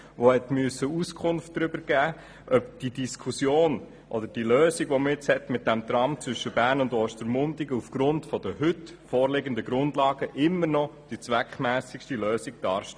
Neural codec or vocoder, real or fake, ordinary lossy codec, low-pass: none; real; none; none